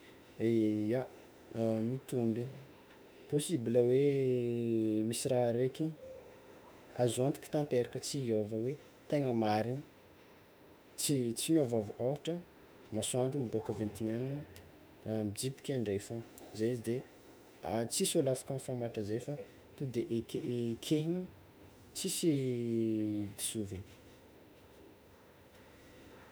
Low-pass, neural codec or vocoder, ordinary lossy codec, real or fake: none; autoencoder, 48 kHz, 32 numbers a frame, DAC-VAE, trained on Japanese speech; none; fake